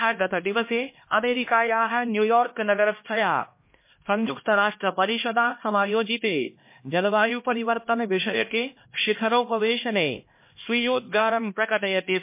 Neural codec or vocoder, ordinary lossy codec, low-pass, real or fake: codec, 16 kHz, 1 kbps, X-Codec, HuBERT features, trained on LibriSpeech; MP3, 24 kbps; 3.6 kHz; fake